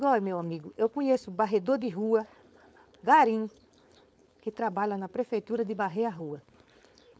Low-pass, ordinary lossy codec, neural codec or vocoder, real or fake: none; none; codec, 16 kHz, 4.8 kbps, FACodec; fake